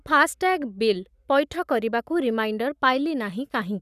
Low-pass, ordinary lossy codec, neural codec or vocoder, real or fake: 14.4 kHz; none; codec, 44.1 kHz, 7.8 kbps, Pupu-Codec; fake